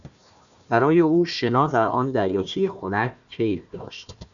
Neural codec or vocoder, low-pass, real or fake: codec, 16 kHz, 1 kbps, FunCodec, trained on Chinese and English, 50 frames a second; 7.2 kHz; fake